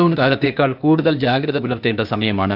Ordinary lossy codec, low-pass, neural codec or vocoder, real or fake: none; 5.4 kHz; codec, 16 kHz, 0.8 kbps, ZipCodec; fake